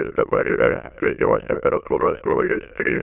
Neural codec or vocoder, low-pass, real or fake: autoencoder, 22.05 kHz, a latent of 192 numbers a frame, VITS, trained on many speakers; 3.6 kHz; fake